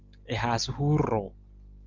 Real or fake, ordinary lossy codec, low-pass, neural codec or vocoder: real; Opus, 32 kbps; 7.2 kHz; none